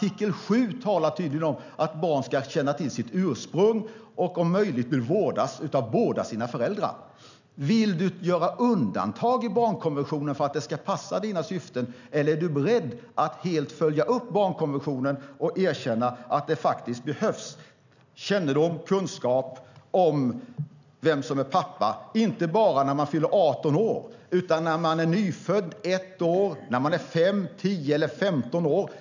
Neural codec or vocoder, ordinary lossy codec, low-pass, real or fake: none; none; 7.2 kHz; real